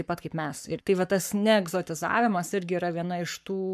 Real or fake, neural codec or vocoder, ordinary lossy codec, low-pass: fake; codec, 44.1 kHz, 7.8 kbps, Pupu-Codec; MP3, 96 kbps; 14.4 kHz